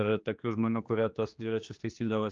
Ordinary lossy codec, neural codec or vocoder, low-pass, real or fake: Opus, 24 kbps; codec, 16 kHz, 2 kbps, X-Codec, HuBERT features, trained on general audio; 7.2 kHz; fake